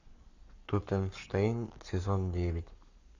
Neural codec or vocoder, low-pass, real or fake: codec, 44.1 kHz, 7.8 kbps, Pupu-Codec; 7.2 kHz; fake